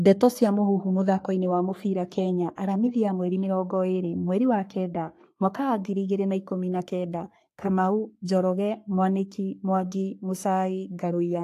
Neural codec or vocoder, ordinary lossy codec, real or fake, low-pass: codec, 44.1 kHz, 3.4 kbps, Pupu-Codec; AAC, 64 kbps; fake; 14.4 kHz